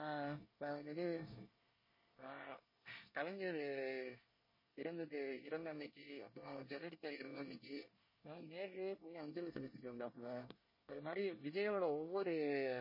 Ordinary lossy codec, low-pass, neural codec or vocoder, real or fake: MP3, 24 kbps; 5.4 kHz; codec, 24 kHz, 1 kbps, SNAC; fake